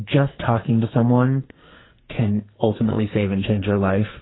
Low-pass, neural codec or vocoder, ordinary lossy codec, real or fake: 7.2 kHz; codec, 44.1 kHz, 2.6 kbps, SNAC; AAC, 16 kbps; fake